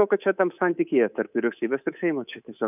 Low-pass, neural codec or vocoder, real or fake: 3.6 kHz; codec, 24 kHz, 3.1 kbps, DualCodec; fake